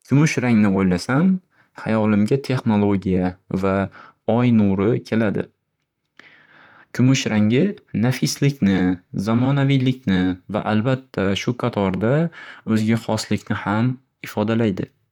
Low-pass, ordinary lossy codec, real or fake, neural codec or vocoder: 19.8 kHz; none; fake; vocoder, 44.1 kHz, 128 mel bands, Pupu-Vocoder